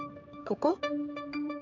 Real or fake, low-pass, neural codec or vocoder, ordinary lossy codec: fake; 7.2 kHz; codec, 16 kHz, 4 kbps, X-Codec, HuBERT features, trained on general audio; none